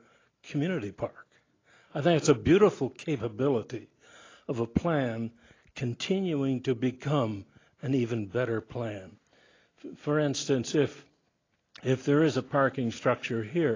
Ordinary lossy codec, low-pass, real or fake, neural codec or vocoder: AAC, 32 kbps; 7.2 kHz; real; none